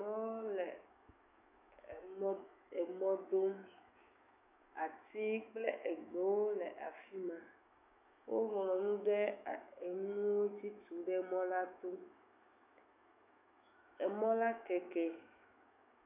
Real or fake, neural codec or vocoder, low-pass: fake; autoencoder, 48 kHz, 128 numbers a frame, DAC-VAE, trained on Japanese speech; 3.6 kHz